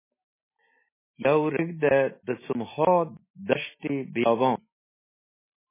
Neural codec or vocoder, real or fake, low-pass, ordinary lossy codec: none; real; 3.6 kHz; MP3, 16 kbps